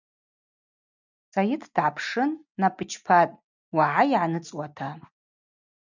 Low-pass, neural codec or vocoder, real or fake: 7.2 kHz; none; real